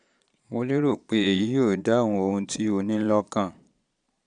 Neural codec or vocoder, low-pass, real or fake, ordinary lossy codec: vocoder, 22.05 kHz, 80 mel bands, Vocos; 9.9 kHz; fake; none